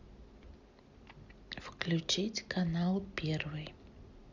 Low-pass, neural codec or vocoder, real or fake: 7.2 kHz; none; real